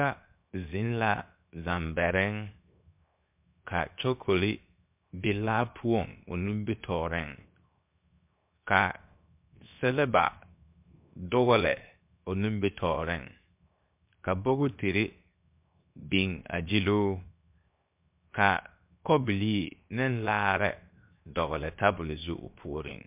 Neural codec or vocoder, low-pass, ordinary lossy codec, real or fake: codec, 16 kHz, 0.7 kbps, FocalCodec; 3.6 kHz; MP3, 32 kbps; fake